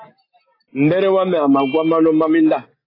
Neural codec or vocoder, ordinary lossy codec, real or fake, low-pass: none; AAC, 24 kbps; real; 5.4 kHz